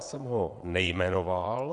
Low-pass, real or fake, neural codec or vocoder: 9.9 kHz; fake; vocoder, 22.05 kHz, 80 mel bands, WaveNeXt